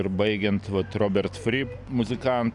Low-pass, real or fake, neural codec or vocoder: 10.8 kHz; real; none